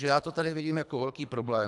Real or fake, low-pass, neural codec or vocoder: fake; 10.8 kHz; codec, 24 kHz, 3 kbps, HILCodec